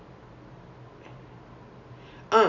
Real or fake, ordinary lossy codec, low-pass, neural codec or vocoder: real; none; 7.2 kHz; none